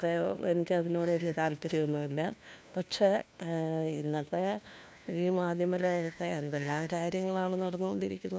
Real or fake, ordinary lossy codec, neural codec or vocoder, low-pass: fake; none; codec, 16 kHz, 1 kbps, FunCodec, trained on LibriTTS, 50 frames a second; none